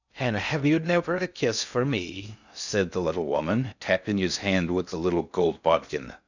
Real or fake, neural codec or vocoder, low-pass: fake; codec, 16 kHz in and 24 kHz out, 0.6 kbps, FocalCodec, streaming, 2048 codes; 7.2 kHz